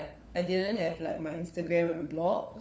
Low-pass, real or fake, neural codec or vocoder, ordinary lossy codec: none; fake; codec, 16 kHz, 4 kbps, FunCodec, trained on LibriTTS, 50 frames a second; none